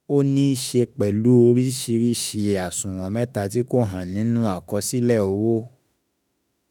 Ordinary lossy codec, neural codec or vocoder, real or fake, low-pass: none; autoencoder, 48 kHz, 32 numbers a frame, DAC-VAE, trained on Japanese speech; fake; none